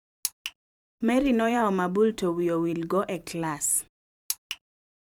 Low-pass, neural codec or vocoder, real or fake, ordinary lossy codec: 19.8 kHz; vocoder, 44.1 kHz, 128 mel bands every 512 samples, BigVGAN v2; fake; none